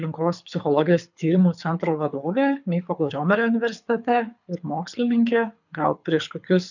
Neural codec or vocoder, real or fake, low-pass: codec, 24 kHz, 6 kbps, HILCodec; fake; 7.2 kHz